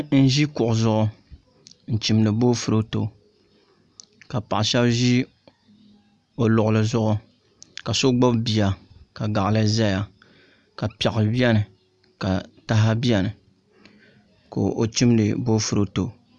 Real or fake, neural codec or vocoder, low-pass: real; none; 10.8 kHz